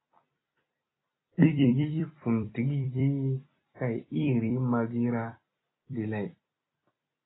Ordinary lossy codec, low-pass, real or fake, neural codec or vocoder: AAC, 16 kbps; 7.2 kHz; fake; vocoder, 44.1 kHz, 128 mel bands every 256 samples, BigVGAN v2